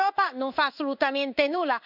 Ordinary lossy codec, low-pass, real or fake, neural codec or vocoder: none; 5.4 kHz; fake; codec, 16 kHz in and 24 kHz out, 1 kbps, XY-Tokenizer